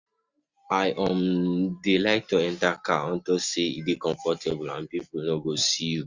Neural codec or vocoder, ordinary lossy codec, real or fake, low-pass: none; Opus, 64 kbps; real; 7.2 kHz